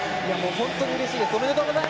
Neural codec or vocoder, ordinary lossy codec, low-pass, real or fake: none; none; none; real